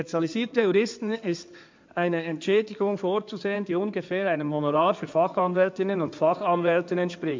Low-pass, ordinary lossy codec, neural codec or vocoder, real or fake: 7.2 kHz; MP3, 64 kbps; codec, 16 kHz in and 24 kHz out, 2.2 kbps, FireRedTTS-2 codec; fake